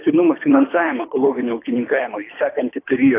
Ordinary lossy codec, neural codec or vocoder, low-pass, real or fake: AAC, 24 kbps; codec, 24 kHz, 3 kbps, HILCodec; 3.6 kHz; fake